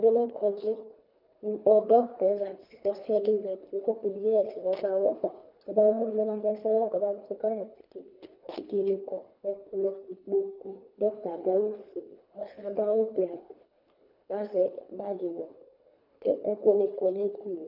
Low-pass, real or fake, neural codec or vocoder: 5.4 kHz; fake; codec, 24 kHz, 3 kbps, HILCodec